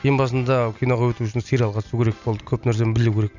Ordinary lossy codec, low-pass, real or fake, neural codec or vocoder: none; 7.2 kHz; real; none